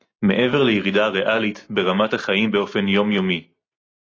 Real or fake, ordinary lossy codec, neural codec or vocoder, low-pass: real; AAC, 32 kbps; none; 7.2 kHz